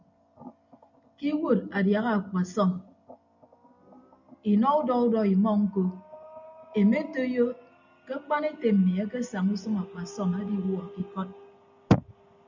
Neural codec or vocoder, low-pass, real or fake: none; 7.2 kHz; real